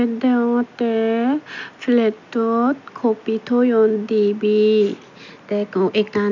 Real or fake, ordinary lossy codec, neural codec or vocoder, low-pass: real; none; none; 7.2 kHz